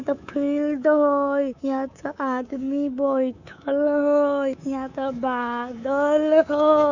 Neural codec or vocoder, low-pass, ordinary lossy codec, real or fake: codec, 24 kHz, 3.1 kbps, DualCodec; 7.2 kHz; none; fake